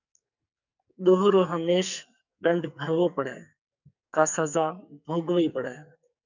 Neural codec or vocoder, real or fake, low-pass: codec, 44.1 kHz, 2.6 kbps, SNAC; fake; 7.2 kHz